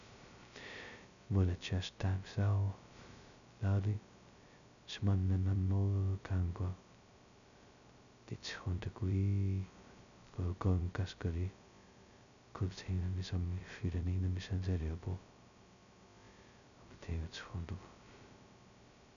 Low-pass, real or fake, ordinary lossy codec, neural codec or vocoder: 7.2 kHz; fake; none; codec, 16 kHz, 0.2 kbps, FocalCodec